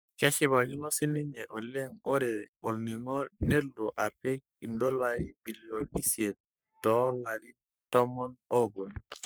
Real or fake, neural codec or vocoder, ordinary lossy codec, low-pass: fake; codec, 44.1 kHz, 3.4 kbps, Pupu-Codec; none; none